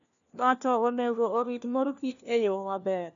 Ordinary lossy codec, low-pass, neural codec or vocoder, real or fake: none; 7.2 kHz; codec, 16 kHz, 1 kbps, FunCodec, trained on LibriTTS, 50 frames a second; fake